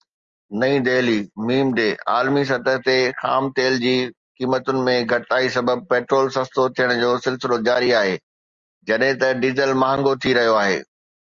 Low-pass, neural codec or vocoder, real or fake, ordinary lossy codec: 7.2 kHz; none; real; Opus, 32 kbps